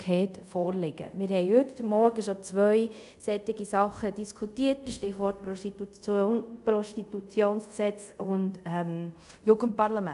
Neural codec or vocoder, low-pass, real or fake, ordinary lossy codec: codec, 24 kHz, 0.5 kbps, DualCodec; 10.8 kHz; fake; MP3, 96 kbps